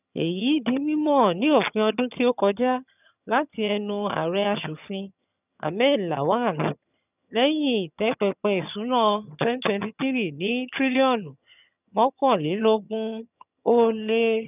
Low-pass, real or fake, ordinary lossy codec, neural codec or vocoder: 3.6 kHz; fake; none; vocoder, 22.05 kHz, 80 mel bands, HiFi-GAN